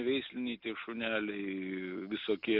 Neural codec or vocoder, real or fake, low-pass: none; real; 5.4 kHz